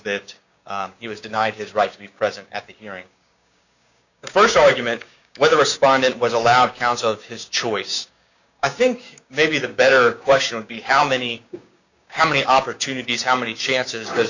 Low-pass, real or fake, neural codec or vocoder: 7.2 kHz; fake; codec, 16 kHz, 6 kbps, DAC